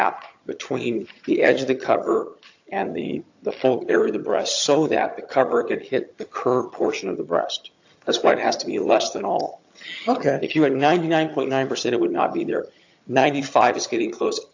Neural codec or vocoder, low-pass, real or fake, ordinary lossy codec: vocoder, 22.05 kHz, 80 mel bands, HiFi-GAN; 7.2 kHz; fake; AAC, 48 kbps